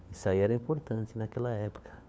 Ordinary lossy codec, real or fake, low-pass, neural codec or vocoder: none; fake; none; codec, 16 kHz, 4 kbps, FunCodec, trained on LibriTTS, 50 frames a second